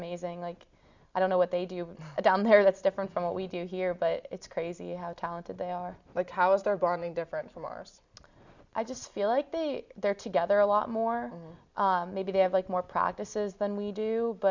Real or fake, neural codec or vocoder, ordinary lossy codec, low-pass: real; none; Opus, 64 kbps; 7.2 kHz